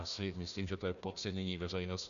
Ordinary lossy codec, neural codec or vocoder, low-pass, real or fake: MP3, 96 kbps; codec, 16 kHz, 1 kbps, FunCodec, trained on Chinese and English, 50 frames a second; 7.2 kHz; fake